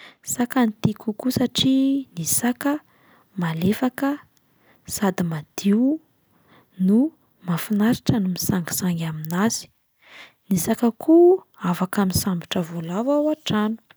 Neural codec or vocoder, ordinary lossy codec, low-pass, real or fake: none; none; none; real